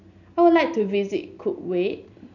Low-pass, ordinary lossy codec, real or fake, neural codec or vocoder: 7.2 kHz; none; real; none